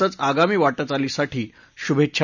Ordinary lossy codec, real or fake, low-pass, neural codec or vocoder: none; real; 7.2 kHz; none